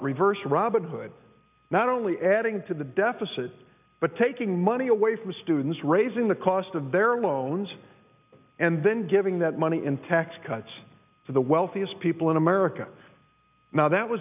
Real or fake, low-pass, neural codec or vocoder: real; 3.6 kHz; none